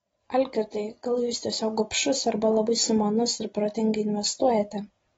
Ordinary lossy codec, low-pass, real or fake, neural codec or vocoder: AAC, 24 kbps; 19.8 kHz; real; none